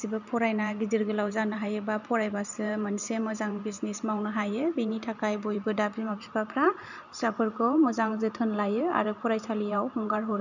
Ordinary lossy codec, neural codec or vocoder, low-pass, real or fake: none; vocoder, 44.1 kHz, 128 mel bands every 512 samples, BigVGAN v2; 7.2 kHz; fake